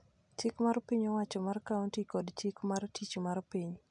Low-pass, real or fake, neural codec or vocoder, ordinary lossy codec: 9.9 kHz; real; none; none